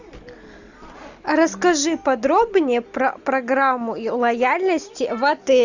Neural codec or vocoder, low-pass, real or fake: none; 7.2 kHz; real